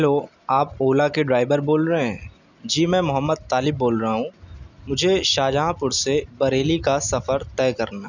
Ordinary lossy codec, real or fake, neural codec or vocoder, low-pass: none; real; none; 7.2 kHz